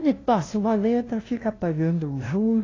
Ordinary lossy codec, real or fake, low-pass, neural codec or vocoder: AAC, 32 kbps; fake; 7.2 kHz; codec, 16 kHz, 0.5 kbps, FunCodec, trained on LibriTTS, 25 frames a second